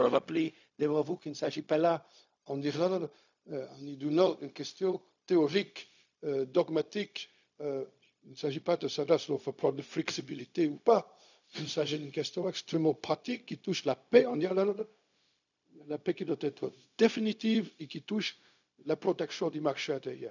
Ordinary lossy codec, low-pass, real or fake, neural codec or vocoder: none; 7.2 kHz; fake; codec, 16 kHz, 0.4 kbps, LongCat-Audio-Codec